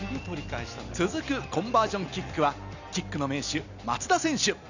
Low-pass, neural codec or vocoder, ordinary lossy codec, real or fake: 7.2 kHz; none; none; real